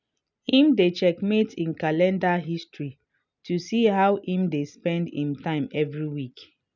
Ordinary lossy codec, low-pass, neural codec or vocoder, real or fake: none; 7.2 kHz; none; real